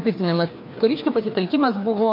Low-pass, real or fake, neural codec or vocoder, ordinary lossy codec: 5.4 kHz; fake; codec, 16 kHz, 2 kbps, FreqCodec, larger model; MP3, 32 kbps